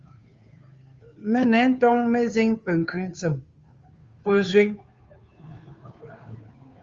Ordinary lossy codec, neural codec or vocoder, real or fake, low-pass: Opus, 32 kbps; codec, 16 kHz, 2 kbps, FunCodec, trained on Chinese and English, 25 frames a second; fake; 7.2 kHz